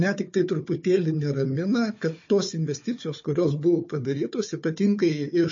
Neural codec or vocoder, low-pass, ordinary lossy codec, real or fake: codec, 16 kHz, 4 kbps, FunCodec, trained on Chinese and English, 50 frames a second; 7.2 kHz; MP3, 32 kbps; fake